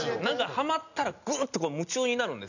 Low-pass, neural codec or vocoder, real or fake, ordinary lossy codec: 7.2 kHz; none; real; none